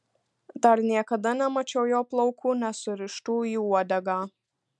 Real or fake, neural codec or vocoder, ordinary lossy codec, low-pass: real; none; MP3, 96 kbps; 10.8 kHz